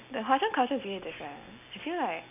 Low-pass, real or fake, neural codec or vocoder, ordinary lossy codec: 3.6 kHz; real; none; none